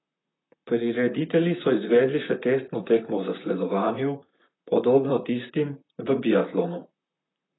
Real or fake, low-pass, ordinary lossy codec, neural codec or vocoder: fake; 7.2 kHz; AAC, 16 kbps; vocoder, 44.1 kHz, 128 mel bands, Pupu-Vocoder